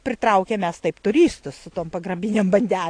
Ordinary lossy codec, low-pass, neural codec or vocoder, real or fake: AAC, 48 kbps; 9.9 kHz; none; real